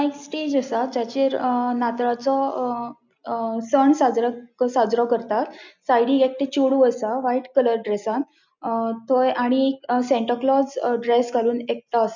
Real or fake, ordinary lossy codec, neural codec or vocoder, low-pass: real; none; none; 7.2 kHz